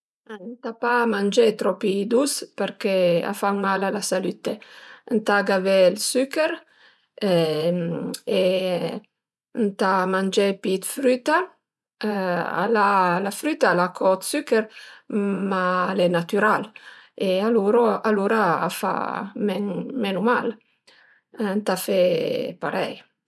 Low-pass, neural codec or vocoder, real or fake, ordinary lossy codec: none; vocoder, 24 kHz, 100 mel bands, Vocos; fake; none